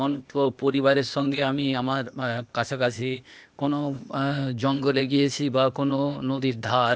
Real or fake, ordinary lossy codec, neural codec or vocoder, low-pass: fake; none; codec, 16 kHz, 0.8 kbps, ZipCodec; none